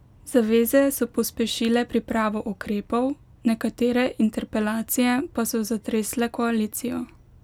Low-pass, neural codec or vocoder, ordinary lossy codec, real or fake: 19.8 kHz; none; none; real